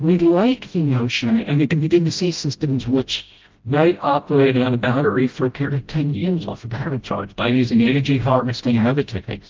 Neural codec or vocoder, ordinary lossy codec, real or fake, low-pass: codec, 16 kHz, 0.5 kbps, FreqCodec, smaller model; Opus, 32 kbps; fake; 7.2 kHz